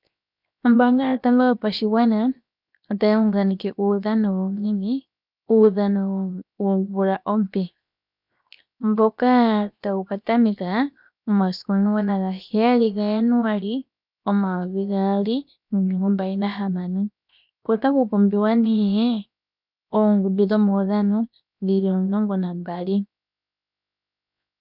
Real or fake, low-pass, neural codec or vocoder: fake; 5.4 kHz; codec, 16 kHz, 0.7 kbps, FocalCodec